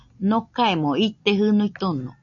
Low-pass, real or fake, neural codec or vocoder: 7.2 kHz; real; none